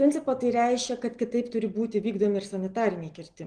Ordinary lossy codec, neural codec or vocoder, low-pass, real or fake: Opus, 24 kbps; none; 9.9 kHz; real